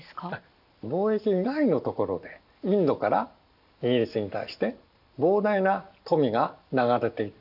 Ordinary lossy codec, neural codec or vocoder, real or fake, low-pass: none; none; real; 5.4 kHz